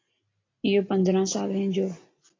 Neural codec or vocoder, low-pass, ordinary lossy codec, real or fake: none; 7.2 kHz; AAC, 32 kbps; real